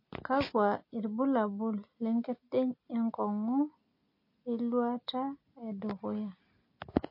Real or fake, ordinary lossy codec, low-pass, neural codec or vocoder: real; MP3, 32 kbps; 5.4 kHz; none